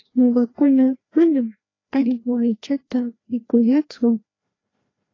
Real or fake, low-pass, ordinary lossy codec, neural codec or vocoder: fake; 7.2 kHz; AAC, 32 kbps; codec, 16 kHz, 1 kbps, FreqCodec, larger model